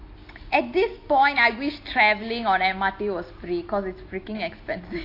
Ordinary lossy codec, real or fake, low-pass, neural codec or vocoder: AAC, 32 kbps; real; 5.4 kHz; none